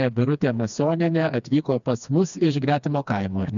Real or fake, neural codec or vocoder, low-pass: fake; codec, 16 kHz, 2 kbps, FreqCodec, smaller model; 7.2 kHz